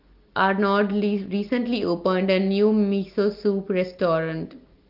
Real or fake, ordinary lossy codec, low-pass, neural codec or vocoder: real; Opus, 32 kbps; 5.4 kHz; none